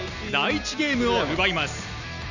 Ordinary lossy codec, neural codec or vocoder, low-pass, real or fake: none; none; 7.2 kHz; real